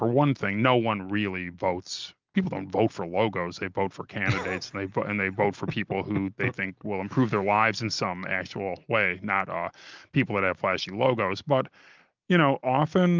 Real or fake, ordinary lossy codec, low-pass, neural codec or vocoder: real; Opus, 24 kbps; 7.2 kHz; none